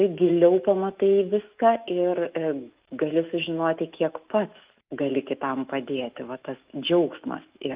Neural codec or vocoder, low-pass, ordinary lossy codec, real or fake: autoencoder, 48 kHz, 128 numbers a frame, DAC-VAE, trained on Japanese speech; 3.6 kHz; Opus, 32 kbps; fake